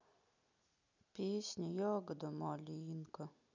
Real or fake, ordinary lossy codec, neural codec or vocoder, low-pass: real; none; none; 7.2 kHz